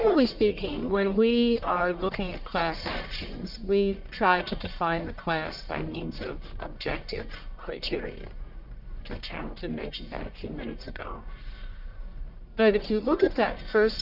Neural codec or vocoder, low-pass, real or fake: codec, 44.1 kHz, 1.7 kbps, Pupu-Codec; 5.4 kHz; fake